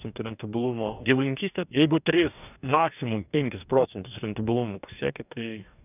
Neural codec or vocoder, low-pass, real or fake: codec, 44.1 kHz, 2.6 kbps, DAC; 3.6 kHz; fake